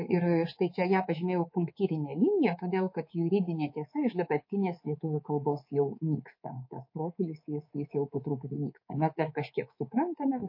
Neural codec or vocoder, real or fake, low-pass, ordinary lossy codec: codec, 24 kHz, 3.1 kbps, DualCodec; fake; 5.4 kHz; MP3, 32 kbps